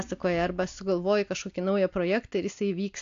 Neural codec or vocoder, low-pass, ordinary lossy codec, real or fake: none; 7.2 kHz; AAC, 64 kbps; real